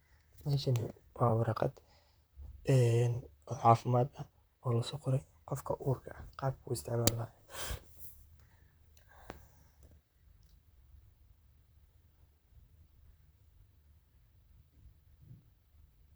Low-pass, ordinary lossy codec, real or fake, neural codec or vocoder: none; none; fake; vocoder, 44.1 kHz, 128 mel bands, Pupu-Vocoder